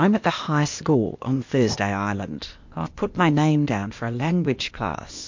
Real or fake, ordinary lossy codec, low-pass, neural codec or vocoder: fake; MP3, 48 kbps; 7.2 kHz; codec, 16 kHz, 0.8 kbps, ZipCodec